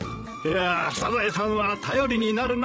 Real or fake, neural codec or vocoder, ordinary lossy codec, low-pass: fake; codec, 16 kHz, 16 kbps, FreqCodec, larger model; none; none